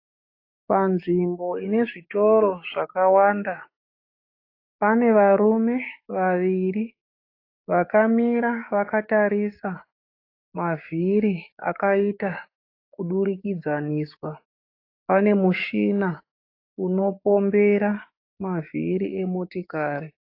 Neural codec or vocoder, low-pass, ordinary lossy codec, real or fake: codec, 16 kHz, 6 kbps, DAC; 5.4 kHz; AAC, 32 kbps; fake